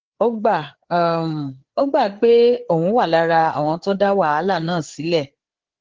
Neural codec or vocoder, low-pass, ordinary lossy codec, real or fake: codec, 16 kHz, 4 kbps, FreqCodec, larger model; 7.2 kHz; Opus, 16 kbps; fake